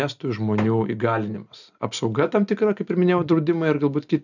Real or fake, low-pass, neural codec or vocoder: real; 7.2 kHz; none